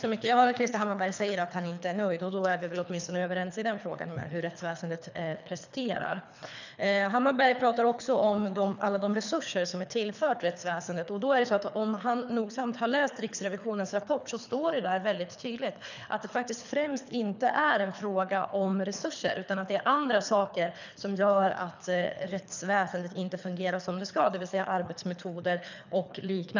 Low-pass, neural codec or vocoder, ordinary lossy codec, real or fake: 7.2 kHz; codec, 24 kHz, 3 kbps, HILCodec; none; fake